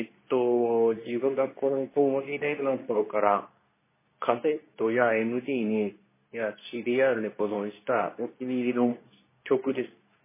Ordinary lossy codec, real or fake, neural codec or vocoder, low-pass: MP3, 16 kbps; fake; codec, 24 kHz, 0.9 kbps, WavTokenizer, medium speech release version 2; 3.6 kHz